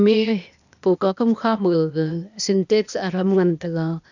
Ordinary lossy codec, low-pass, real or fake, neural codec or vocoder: none; 7.2 kHz; fake; codec, 16 kHz, 0.8 kbps, ZipCodec